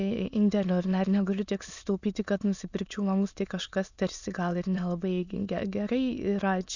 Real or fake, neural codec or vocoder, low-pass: fake; autoencoder, 22.05 kHz, a latent of 192 numbers a frame, VITS, trained on many speakers; 7.2 kHz